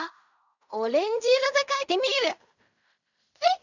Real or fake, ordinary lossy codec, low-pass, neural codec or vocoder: fake; none; 7.2 kHz; codec, 16 kHz in and 24 kHz out, 0.4 kbps, LongCat-Audio-Codec, fine tuned four codebook decoder